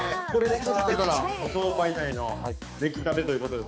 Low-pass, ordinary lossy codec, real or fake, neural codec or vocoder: none; none; fake; codec, 16 kHz, 4 kbps, X-Codec, HuBERT features, trained on balanced general audio